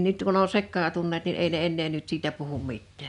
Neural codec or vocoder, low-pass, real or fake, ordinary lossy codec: none; 10.8 kHz; real; none